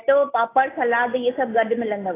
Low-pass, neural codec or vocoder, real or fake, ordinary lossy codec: 3.6 kHz; none; real; AAC, 16 kbps